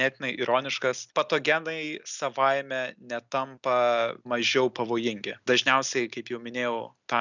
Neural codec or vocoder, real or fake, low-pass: none; real; 7.2 kHz